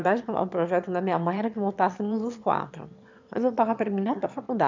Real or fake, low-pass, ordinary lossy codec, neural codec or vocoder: fake; 7.2 kHz; MP3, 64 kbps; autoencoder, 22.05 kHz, a latent of 192 numbers a frame, VITS, trained on one speaker